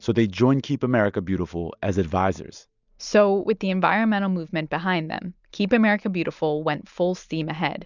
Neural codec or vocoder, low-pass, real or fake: none; 7.2 kHz; real